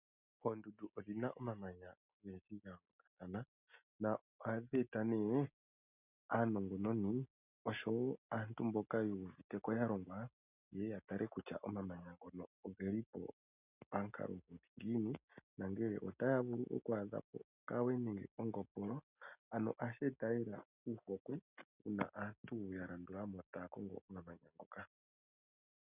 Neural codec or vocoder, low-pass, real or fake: none; 3.6 kHz; real